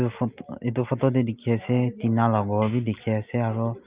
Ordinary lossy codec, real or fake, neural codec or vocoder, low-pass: Opus, 24 kbps; real; none; 3.6 kHz